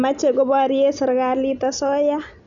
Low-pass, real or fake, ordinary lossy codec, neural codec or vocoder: 7.2 kHz; real; none; none